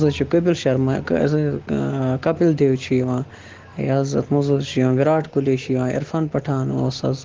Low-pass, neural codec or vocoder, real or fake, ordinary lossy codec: 7.2 kHz; none; real; Opus, 16 kbps